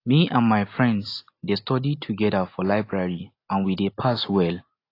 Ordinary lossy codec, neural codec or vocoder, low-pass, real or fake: AAC, 32 kbps; none; 5.4 kHz; real